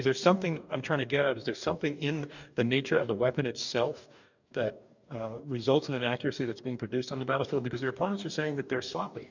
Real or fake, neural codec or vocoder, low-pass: fake; codec, 44.1 kHz, 2.6 kbps, DAC; 7.2 kHz